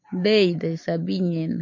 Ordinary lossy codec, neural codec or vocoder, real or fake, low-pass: MP3, 64 kbps; none; real; 7.2 kHz